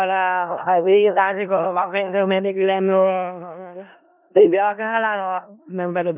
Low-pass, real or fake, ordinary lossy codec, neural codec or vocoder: 3.6 kHz; fake; none; codec, 16 kHz in and 24 kHz out, 0.4 kbps, LongCat-Audio-Codec, four codebook decoder